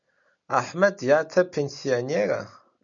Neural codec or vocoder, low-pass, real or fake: none; 7.2 kHz; real